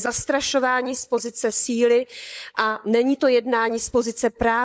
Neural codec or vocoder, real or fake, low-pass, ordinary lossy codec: codec, 16 kHz, 16 kbps, FunCodec, trained on LibriTTS, 50 frames a second; fake; none; none